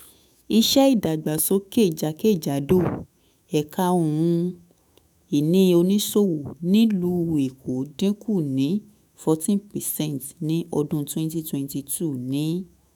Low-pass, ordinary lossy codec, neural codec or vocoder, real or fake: none; none; autoencoder, 48 kHz, 128 numbers a frame, DAC-VAE, trained on Japanese speech; fake